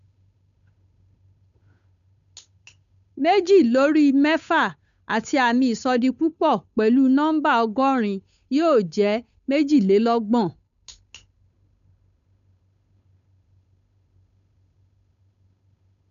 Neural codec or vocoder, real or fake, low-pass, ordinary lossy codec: codec, 16 kHz, 8 kbps, FunCodec, trained on Chinese and English, 25 frames a second; fake; 7.2 kHz; none